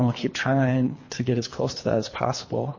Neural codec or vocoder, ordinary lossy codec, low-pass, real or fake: codec, 24 kHz, 3 kbps, HILCodec; MP3, 32 kbps; 7.2 kHz; fake